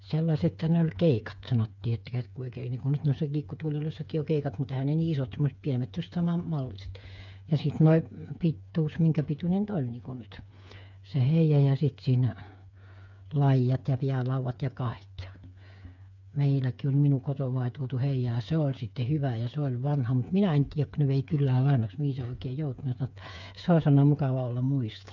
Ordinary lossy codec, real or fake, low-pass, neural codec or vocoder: none; fake; 7.2 kHz; codec, 16 kHz, 8 kbps, FreqCodec, smaller model